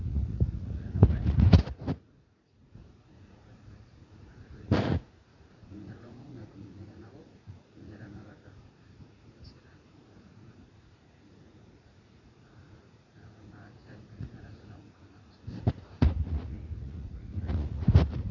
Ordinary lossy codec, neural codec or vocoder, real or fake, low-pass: none; vocoder, 44.1 kHz, 80 mel bands, Vocos; fake; 7.2 kHz